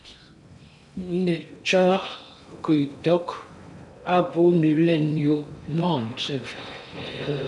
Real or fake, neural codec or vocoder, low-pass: fake; codec, 16 kHz in and 24 kHz out, 0.6 kbps, FocalCodec, streaming, 4096 codes; 10.8 kHz